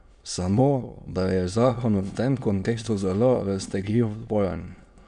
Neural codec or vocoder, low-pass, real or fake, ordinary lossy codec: autoencoder, 22.05 kHz, a latent of 192 numbers a frame, VITS, trained on many speakers; 9.9 kHz; fake; none